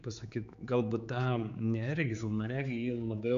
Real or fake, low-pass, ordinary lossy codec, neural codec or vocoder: fake; 7.2 kHz; AAC, 96 kbps; codec, 16 kHz, 4 kbps, X-Codec, HuBERT features, trained on general audio